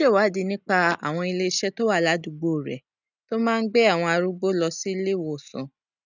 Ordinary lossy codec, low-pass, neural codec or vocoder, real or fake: none; 7.2 kHz; none; real